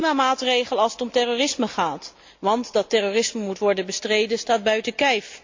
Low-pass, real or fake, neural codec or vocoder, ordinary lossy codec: 7.2 kHz; real; none; MP3, 48 kbps